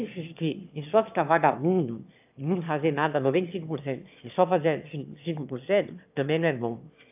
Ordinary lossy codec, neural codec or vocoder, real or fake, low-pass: AAC, 32 kbps; autoencoder, 22.05 kHz, a latent of 192 numbers a frame, VITS, trained on one speaker; fake; 3.6 kHz